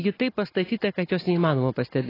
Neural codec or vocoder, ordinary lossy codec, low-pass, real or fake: none; AAC, 24 kbps; 5.4 kHz; real